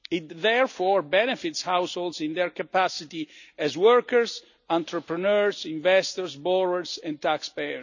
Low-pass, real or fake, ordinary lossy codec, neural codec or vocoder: 7.2 kHz; real; none; none